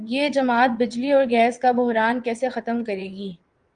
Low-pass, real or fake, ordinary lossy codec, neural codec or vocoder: 9.9 kHz; fake; Opus, 32 kbps; vocoder, 22.05 kHz, 80 mel bands, WaveNeXt